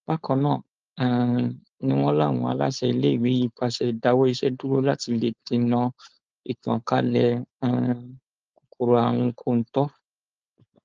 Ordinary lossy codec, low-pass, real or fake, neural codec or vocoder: Opus, 24 kbps; 7.2 kHz; fake; codec, 16 kHz, 4.8 kbps, FACodec